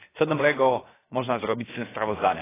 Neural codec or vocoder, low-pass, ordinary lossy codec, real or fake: codec, 16 kHz, about 1 kbps, DyCAST, with the encoder's durations; 3.6 kHz; AAC, 16 kbps; fake